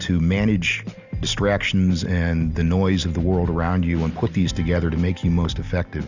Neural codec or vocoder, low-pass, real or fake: none; 7.2 kHz; real